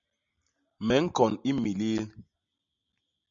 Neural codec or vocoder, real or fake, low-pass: none; real; 7.2 kHz